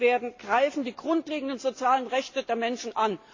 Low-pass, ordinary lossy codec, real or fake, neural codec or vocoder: 7.2 kHz; AAC, 48 kbps; real; none